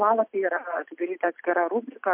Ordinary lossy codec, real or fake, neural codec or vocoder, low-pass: MP3, 32 kbps; real; none; 3.6 kHz